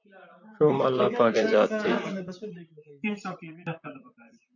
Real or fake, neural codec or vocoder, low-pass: fake; vocoder, 44.1 kHz, 128 mel bands, Pupu-Vocoder; 7.2 kHz